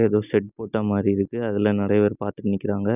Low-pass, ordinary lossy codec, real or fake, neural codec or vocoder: 3.6 kHz; none; real; none